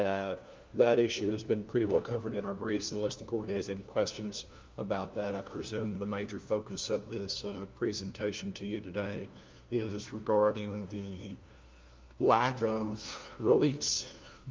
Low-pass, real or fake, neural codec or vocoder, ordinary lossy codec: 7.2 kHz; fake; codec, 16 kHz, 1 kbps, FunCodec, trained on LibriTTS, 50 frames a second; Opus, 24 kbps